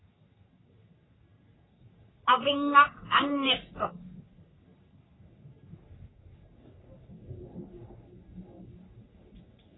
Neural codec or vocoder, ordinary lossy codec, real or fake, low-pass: codec, 44.1 kHz, 3.4 kbps, Pupu-Codec; AAC, 16 kbps; fake; 7.2 kHz